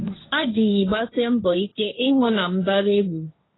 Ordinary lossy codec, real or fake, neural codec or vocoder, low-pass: AAC, 16 kbps; fake; codec, 16 kHz, 1.1 kbps, Voila-Tokenizer; 7.2 kHz